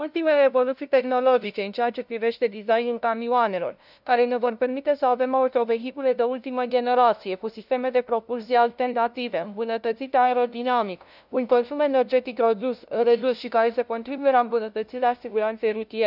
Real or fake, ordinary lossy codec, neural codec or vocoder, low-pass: fake; none; codec, 16 kHz, 0.5 kbps, FunCodec, trained on LibriTTS, 25 frames a second; 5.4 kHz